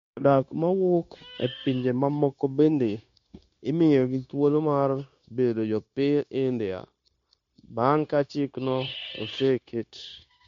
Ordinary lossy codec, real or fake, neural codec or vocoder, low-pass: MP3, 48 kbps; fake; codec, 16 kHz, 0.9 kbps, LongCat-Audio-Codec; 7.2 kHz